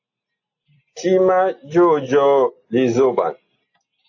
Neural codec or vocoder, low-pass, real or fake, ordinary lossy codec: none; 7.2 kHz; real; AAC, 48 kbps